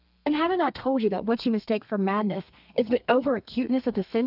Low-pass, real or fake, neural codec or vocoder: 5.4 kHz; fake; codec, 32 kHz, 1.9 kbps, SNAC